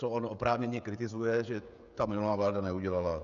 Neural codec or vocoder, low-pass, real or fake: codec, 16 kHz, 16 kbps, FreqCodec, smaller model; 7.2 kHz; fake